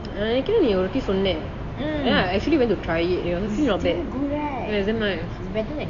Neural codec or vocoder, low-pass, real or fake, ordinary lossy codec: none; 7.2 kHz; real; AAC, 48 kbps